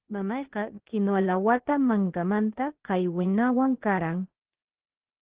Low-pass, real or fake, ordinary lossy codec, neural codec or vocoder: 3.6 kHz; fake; Opus, 16 kbps; codec, 16 kHz, 0.8 kbps, ZipCodec